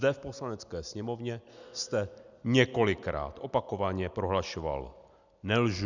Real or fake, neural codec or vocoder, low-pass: real; none; 7.2 kHz